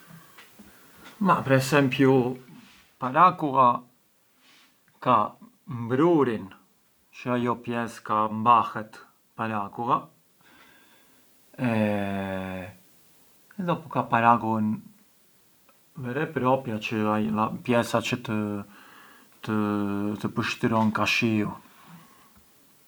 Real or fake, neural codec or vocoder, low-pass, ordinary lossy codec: real; none; none; none